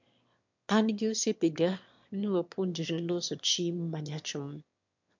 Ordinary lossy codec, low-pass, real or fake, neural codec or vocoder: MP3, 64 kbps; 7.2 kHz; fake; autoencoder, 22.05 kHz, a latent of 192 numbers a frame, VITS, trained on one speaker